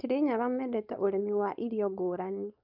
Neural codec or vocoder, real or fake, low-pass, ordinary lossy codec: codec, 16 kHz, 4.8 kbps, FACodec; fake; 5.4 kHz; none